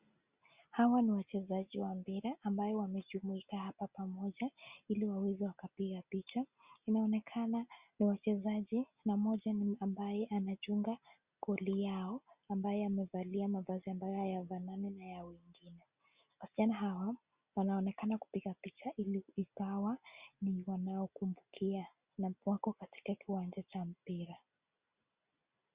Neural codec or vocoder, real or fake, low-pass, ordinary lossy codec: none; real; 3.6 kHz; Opus, 64 kbps